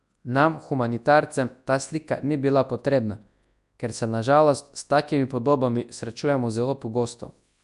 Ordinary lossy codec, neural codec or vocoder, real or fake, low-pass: AAC, 96 kbps; codec, 24 kHz, 0.9 kbps, WavTokenizer, large speech release; fake; 10.8 kHz